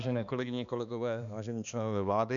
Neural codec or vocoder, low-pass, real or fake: codec, 16 kHz, 2 kbps, X-Codec, HuBERT features, trained on balanced general audio; 7.2 kHz; fake